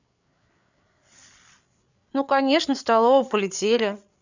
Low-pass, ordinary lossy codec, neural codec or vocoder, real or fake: 7.2 kHz; none; codec, 16 kHz, 8 kbps, FreqCodec, larger model; fake